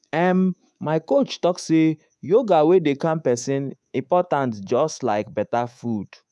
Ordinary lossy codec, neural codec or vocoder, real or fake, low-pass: none; codec, 24 kHz, 3.1 kbps, DualCodec; fake; none